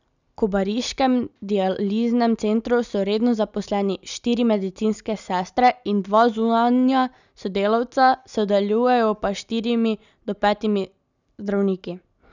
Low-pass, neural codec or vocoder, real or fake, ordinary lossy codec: 7.2 kHz; none; real; none